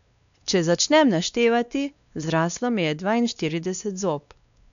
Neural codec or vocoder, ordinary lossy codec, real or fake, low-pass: codec, 16 kHz, 2 kbps, X-Codec, WavLM features, trained on Multilingual LibriSpeech; none; fake; 7.2 kHz